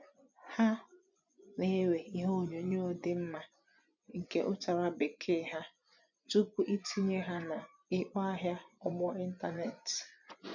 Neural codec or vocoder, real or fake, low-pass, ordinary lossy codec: vocoder, 24 kHz, 100 mel bands, Vocos; fake; 7.2 kHz; none